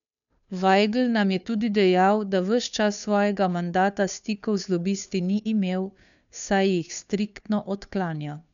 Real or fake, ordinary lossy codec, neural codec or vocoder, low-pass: fake; none; codec, 16 kHz, 2 kbps, FunCodec, trained on Chinese and English, 25 frames a second; 7.2 kHz